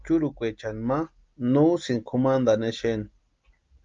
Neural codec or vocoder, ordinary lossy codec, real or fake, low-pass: none; Opus, 32 kbps; real; 7.2 kHz